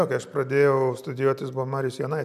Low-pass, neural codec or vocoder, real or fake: 14.4 kHz; none; real